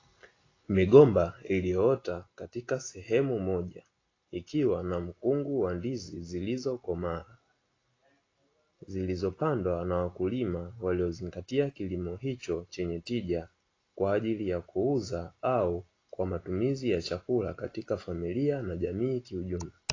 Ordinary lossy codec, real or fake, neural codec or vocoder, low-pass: AAC, 32 kbps; real; none; 7.2 kHz